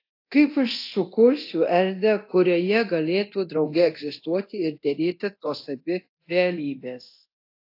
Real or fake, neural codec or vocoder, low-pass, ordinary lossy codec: fake; codec, 24 kHz, 0.9 kbps, DualCodec; 5.4 kHz; AAC, 32 kbps